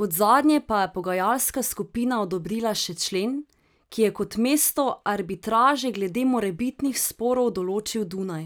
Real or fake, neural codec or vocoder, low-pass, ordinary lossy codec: real; none; none; none